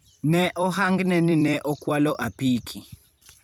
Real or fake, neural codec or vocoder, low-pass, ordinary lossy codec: fake; vocoder, 44.1 kHz, 128 mel bands every 256 samples, BigVGAN v2; 19.8 kHz; none